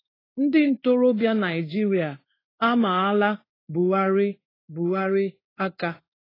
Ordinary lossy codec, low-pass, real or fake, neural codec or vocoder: AAC, 24 kbps; 5.4 kHz; fake; codec, 16 kHz in and 24 kHz out, 1 kbps, XY-Tokenizer